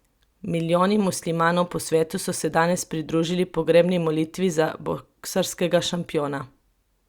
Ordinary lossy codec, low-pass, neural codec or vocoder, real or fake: Opus, 64 kbps; 19.8 kHz; none; real